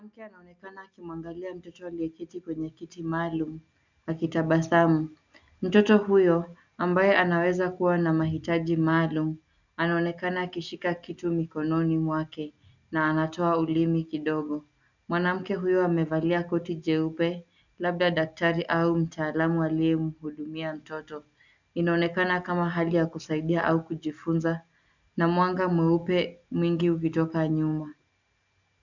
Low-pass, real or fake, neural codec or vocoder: 7.2 kHz; real; none